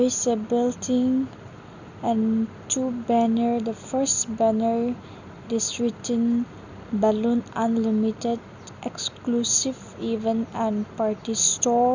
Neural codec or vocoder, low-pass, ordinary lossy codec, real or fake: none; 7.2 kHz; none; real